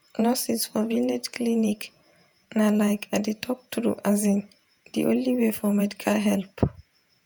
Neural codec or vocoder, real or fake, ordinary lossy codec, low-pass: vocoder, 48 kHz, 128 mel bands, Vocos; fake; none; none